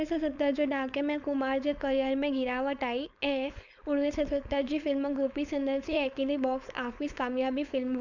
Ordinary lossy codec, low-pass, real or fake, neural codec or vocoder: none; 7.2 kHz; fake; codec, 16 kHz, 4.8 kbps, FACodec